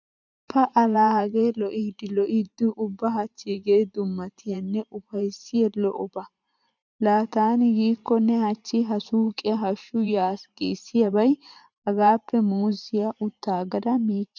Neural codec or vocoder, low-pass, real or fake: vocoder, 44.1 kHz, 128 mel bands every 512 samples, BigVGAN v2; 7.2 kHz; fake